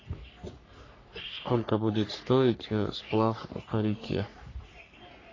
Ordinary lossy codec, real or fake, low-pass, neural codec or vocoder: AAC, 32 kbps; fake; 7.2 kHz; codec, 44.1 kHz, 3.4 kbps, Pupu-Codec